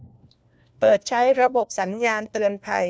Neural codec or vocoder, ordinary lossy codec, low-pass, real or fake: codec, 16 kHz, 1 kbps, FunCodec, trained on LibriTTS, 50 frames a second; none; none; fake